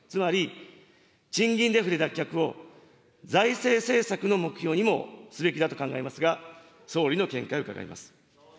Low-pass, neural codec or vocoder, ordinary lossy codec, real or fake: none; none; none; real